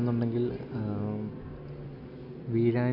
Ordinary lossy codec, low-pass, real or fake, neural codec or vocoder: none; 5.4 kHz; real; none